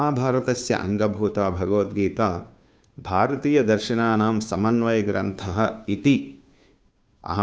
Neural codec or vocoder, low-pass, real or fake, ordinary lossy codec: codec, 16 kHz, 2 kbps, FunCodec, trained on Chinese and English, 25 frames a second; none; fake; none